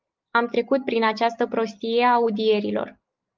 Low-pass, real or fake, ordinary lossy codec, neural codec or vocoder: 7.2 kHz; real; Opus, 24 kbps; none